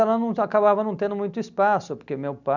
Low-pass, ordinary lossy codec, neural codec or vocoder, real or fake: 7.2 kHz; none; none; real